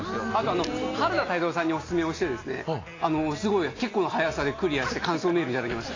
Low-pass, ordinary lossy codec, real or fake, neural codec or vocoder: 7.2 kHz; AAC, 32 kbps; real; none